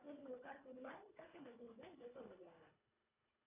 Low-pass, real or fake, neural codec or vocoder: 3.6 kHz; fake; codec, 24 kHz, 1.5 kbps, HILCodec